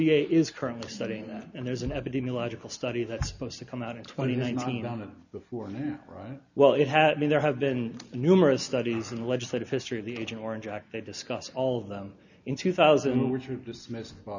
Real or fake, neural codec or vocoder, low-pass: real; none; 7.2 kHz